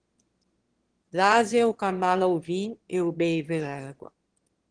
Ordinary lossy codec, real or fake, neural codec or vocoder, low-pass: Opus, 16 kbps; fake; autoencoder, 22.05 kHz, a latent of 192 numbers a frame, VITS, trained on one speaker; 9.9 kHz